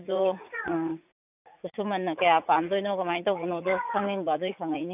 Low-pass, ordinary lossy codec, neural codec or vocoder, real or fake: 3.6 kHz; none; vocoder, 44.1 kHz, 80 mel bands, Vocos; fake